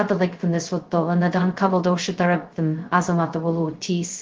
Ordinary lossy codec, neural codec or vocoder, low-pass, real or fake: Opus, 16 kbps; codec, 16 kHz, 0.2 kbps, FocalCodec; 7.2 kHz; fake